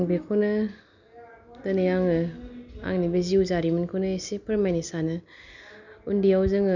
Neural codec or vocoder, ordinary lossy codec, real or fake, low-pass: none; none; real; 7.2 kHz